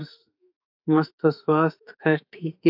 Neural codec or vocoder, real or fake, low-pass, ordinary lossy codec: autoencoder, 48 kHz, 32 numbers a frame, DAC-VAE, trained on Japanese speech; fake; 5.4 kHz; AAC, 48 kbps